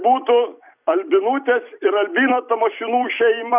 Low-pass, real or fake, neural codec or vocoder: 3.6 kHz; fake; vocoder, 44.1 kHz, 128 mel bands every 256 samples, BigVGAN v2